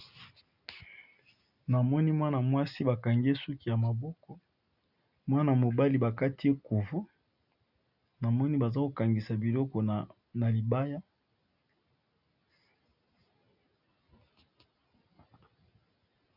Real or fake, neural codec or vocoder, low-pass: real; none; 5.4 kHz